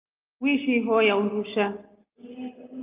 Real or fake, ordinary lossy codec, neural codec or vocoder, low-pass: real; Opus, 24 kbps; none; 3.6 kHz